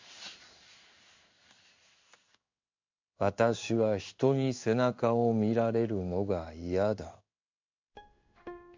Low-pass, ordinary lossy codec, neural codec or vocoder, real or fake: 7.2 kHz; MP3, 64 kbps; codec, 16 kHz in and 24 kHz out, 1 kbps, XY-Tokenizer; fake